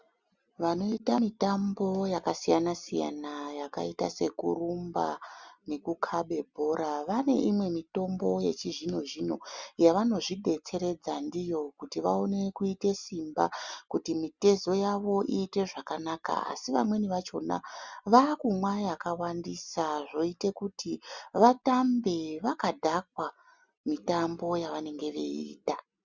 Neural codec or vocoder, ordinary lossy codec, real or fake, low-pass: none; Opus, 64 kbps; real; 7.2 kHz